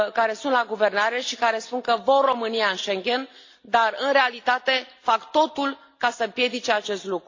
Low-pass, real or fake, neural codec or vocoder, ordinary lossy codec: 7.2 kHz; real; none; AAC, 48 kbps